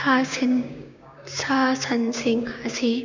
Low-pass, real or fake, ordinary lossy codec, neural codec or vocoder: 7.2 kHz; fake; none; codec, 16 kHz, 6 kbps, DAC